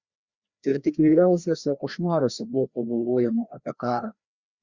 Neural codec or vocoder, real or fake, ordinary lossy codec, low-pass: codec, 16 kHz, 1 kbps, FreqCodec, larger model; fake; Opus, 64 kbps; 7.2 kHz